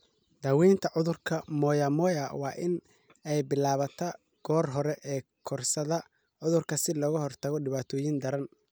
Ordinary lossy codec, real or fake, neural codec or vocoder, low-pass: none; real; none; none